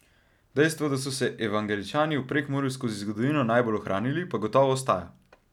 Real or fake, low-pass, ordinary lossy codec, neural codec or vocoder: real; 19.8 kHz; none; none